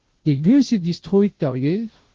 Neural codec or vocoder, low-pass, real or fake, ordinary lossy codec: codec, 16 kHz, 0.5 kbps, FunCodec, trained on Chinese and English, 25 frames a second; 7.2 kHz; fake; Opus, 16 kbps